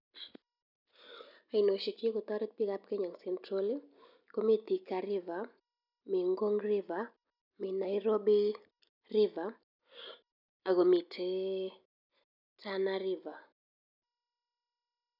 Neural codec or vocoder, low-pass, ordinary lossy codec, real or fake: none; 5.4 kHz; none; real